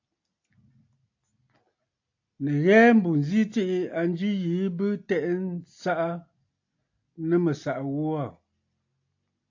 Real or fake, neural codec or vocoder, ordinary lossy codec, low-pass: real; none; AAC, 48 kbps; 7.2 kHz